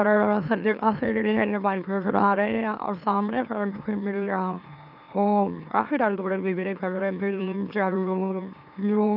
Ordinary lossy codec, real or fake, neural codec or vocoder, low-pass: none; fake; autoencoder, 44.1 kHz, a latent of 192 numbers a frame, MeloTTS; 5.4 kHz